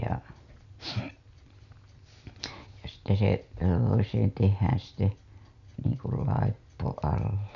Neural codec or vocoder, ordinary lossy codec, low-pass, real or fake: none; none; 7.2 kHz; real